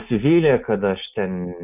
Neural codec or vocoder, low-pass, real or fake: none; 3.6 kHz; real